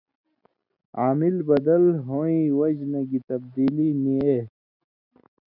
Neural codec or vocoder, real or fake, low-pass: none; real; 5.4 kHz